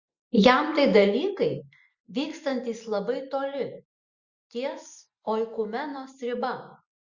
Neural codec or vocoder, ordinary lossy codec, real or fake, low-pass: none; Opus, 64 kbps; real; 7.2 kHz